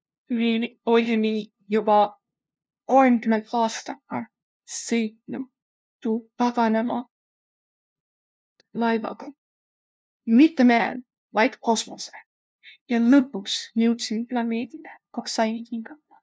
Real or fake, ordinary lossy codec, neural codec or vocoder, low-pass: fake; none; codec, 16 kHz, 0.5 kbps, FunCodec, trained on LibriTTS, 25 frames a second; none